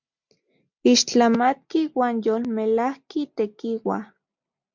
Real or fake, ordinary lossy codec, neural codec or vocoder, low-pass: real; MP3, 64 kbps; none; 7.2 kHz